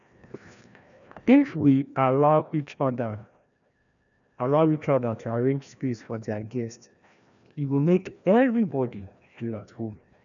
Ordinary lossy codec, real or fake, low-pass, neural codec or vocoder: none; fake; 7.2 kHz; codec, 16 kHz, 1 kbps, FreqCodec, larger model